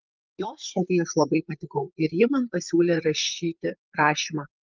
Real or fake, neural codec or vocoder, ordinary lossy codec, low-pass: fake; vocoder, 44.1 kHz, 128 mel bands, Pupu-Vocoder; Opus, 24 kbps; 7.2 kHz